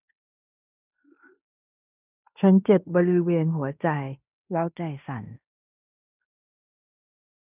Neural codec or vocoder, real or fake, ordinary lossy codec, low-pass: codec, 16 kHz in and 24 kHz out, 0.9 kbps, LongCat-Audio-Codec, fine tuned four codebook decoder; fake; none; 3.6 kHz